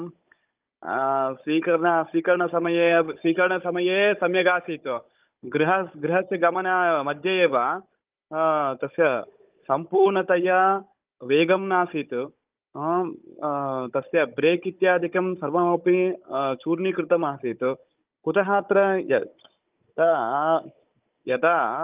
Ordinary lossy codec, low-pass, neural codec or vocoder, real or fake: Opus, 24 kbps; 3.6 kHz; codec, 16 kHz, 16 kbps, FunCodec, trained on Chinese and English, 50 frames a second; fake